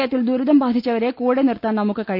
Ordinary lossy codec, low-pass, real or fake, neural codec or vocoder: none; 5.4 kHz; real; none